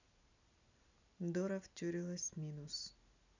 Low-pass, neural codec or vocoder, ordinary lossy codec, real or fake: 7.2 kHz; none; none; real